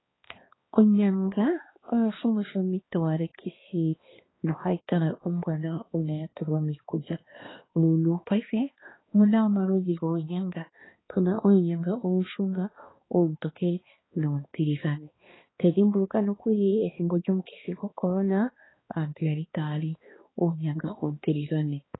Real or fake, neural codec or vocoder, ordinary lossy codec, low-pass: fake; codec, 16 kHz, 2 kbps, X-Codec, HuBERT features, trained on balanced general audio; AAC, 16 kbps; 7.2 kHz